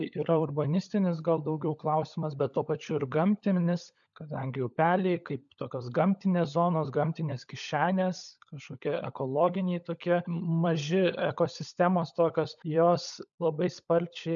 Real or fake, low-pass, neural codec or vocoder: fake; 7.2 kHz; codec, 16 kHz, 16 kbps, FunCodec, trained on LibriTTS, 50 frames a second